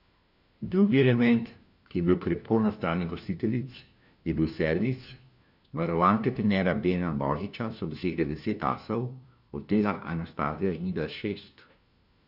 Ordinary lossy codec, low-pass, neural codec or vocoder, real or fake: none; 5.4 kHz; codec, 16 kHz, 1 kbps, FunCodec, trained on LibriTTS, 50 frames a second; fake